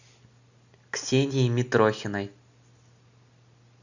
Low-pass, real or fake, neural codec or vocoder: 7.2 kHz; real; none